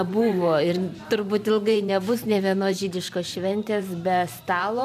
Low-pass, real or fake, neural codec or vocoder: 14.4 kHz; fake; vocoder, 44.1 kHz, 128 mel bands every 512 samples, BigVGAN v2